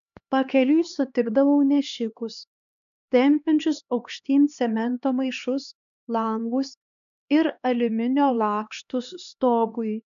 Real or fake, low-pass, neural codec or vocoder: fake; 7.2 kHz; codec, 16 kHz, 2 kbps, X-Codec, HuBERT features, trained on LibriSpeech